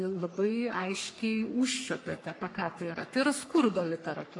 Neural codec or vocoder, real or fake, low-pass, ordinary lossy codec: codec, 44.1 kHz, 3.4 kbps, Pupu-Codec; fake; 10.8 kHz; MP3, 64 kbps